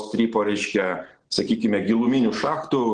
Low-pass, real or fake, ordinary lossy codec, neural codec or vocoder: 9.9 kHz; real; Opus, 16 kbps; none